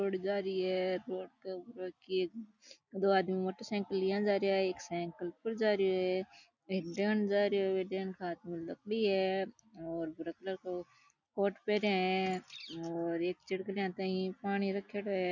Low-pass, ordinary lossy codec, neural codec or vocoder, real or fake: 7.2 kHz; none; none; real